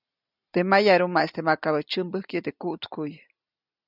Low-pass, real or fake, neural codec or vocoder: 5.4 kHz; real; none